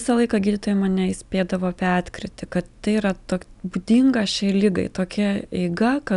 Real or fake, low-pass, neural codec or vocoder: real; 10.8 kHz; none